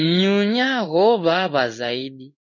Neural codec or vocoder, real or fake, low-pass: codec, 16 kHz in and 24 kHz out, 1 kbps, XY-Tokenizer; fake; 7.2 kHz